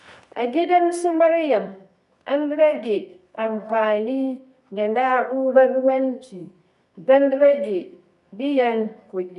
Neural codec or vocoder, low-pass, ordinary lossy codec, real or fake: codec, 24 kHz, 0.9 kbps, WavTokenizer, medium music audio release; 10.8 kHz; none; fake